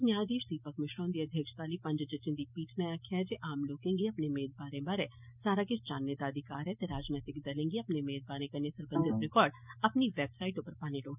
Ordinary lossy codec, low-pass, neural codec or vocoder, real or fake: none; 3.6 kHz; none; real